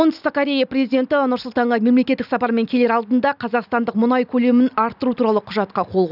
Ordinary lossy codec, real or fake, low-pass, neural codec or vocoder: none; real; 5.4 kHz; none